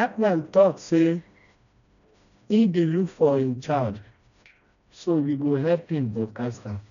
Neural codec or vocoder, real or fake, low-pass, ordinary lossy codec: codec, 16 kHz, 1 kbps, FreqCodec, smaller model; fake; 7.2 kHz; none